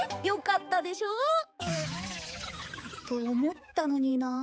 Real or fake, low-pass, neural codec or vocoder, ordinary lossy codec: fake; none; codec, 16 kHz, 4 kbps, X-Codec, HuBERT features, trained on balanced general audio; none